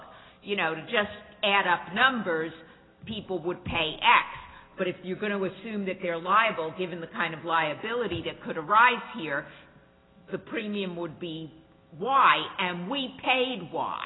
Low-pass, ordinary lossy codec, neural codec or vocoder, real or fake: 7.2 kHz; AAC, 16 kbps; none; real